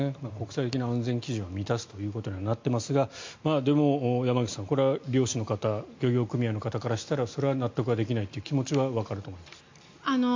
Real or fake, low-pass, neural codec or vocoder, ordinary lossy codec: real; 7.2 kHz; none; MP3, 48 kbps